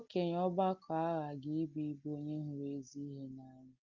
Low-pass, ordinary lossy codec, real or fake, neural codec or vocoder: 7.2 kHz; Opus, 32 kbps; real; none